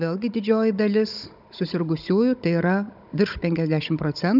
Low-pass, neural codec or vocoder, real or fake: 5.4 kHz; codec, 16 kHz, 16 kbps, FunCodec, trained on Chinese and English, 50 frames a second; fake